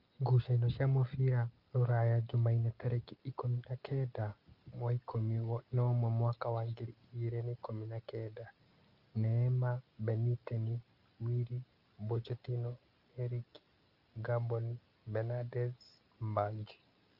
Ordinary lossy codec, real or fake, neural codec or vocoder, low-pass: Opus, 32 kbps; real; none; 5.4 kHz